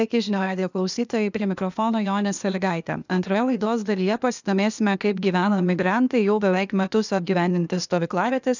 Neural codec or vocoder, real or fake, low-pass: codec, 16 kHz, 0.8 kbps, ZipCodec; fake; 7.2 kHz